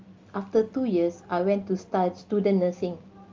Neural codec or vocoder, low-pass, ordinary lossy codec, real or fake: none; 7.2 kHz; Opus, 32 kbps; real